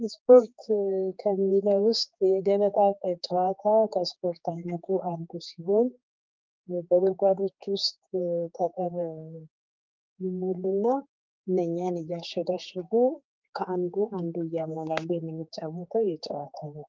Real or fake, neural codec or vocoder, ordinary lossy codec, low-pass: fake; codec, 16 kHz, 4 kbps, X-Codec, HuBERT features, trained on general audio; Opus, 24 kbps; 7.2 kHz